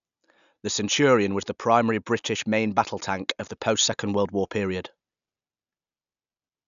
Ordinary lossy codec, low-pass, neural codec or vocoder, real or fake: none; 7.2 kHz; none; real